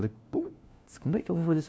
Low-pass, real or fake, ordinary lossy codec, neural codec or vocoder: none; fake; none; codec, 16 kHz, 1 kbps, FunCodec, trained on LibriTTS, 50 frames a second